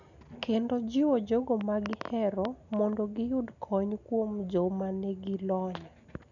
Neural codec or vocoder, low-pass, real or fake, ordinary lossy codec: none; 7.2 kHz; real; none